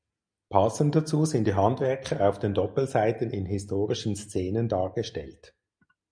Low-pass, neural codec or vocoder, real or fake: 9.9 kHz; none; real